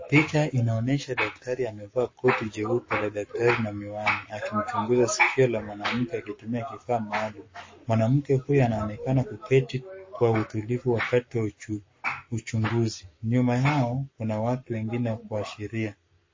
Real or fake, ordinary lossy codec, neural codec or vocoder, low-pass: fake; MP3, 32 kbps; codec, 44.1 kHz, 7.8 kbps, Pupu-Codec; 7.2 kHz